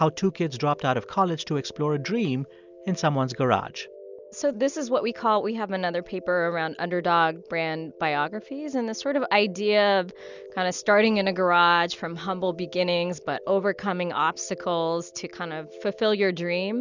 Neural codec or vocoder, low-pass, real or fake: none; 7.2 kHz; real